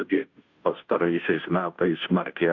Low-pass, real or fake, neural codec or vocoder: 7.2 kHz; fake; codec, 16 kHz, 0.5 kbps, FunCodec, trained on Chinese and English, 25 frames a second